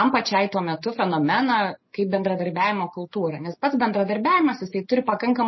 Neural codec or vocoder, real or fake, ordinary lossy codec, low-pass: none; real; MP3, 24 kbps; 7.2 kHz